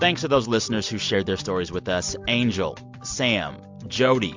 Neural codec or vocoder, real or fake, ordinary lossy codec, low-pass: none; real; MP3, 64 kbps; 7.2 kHz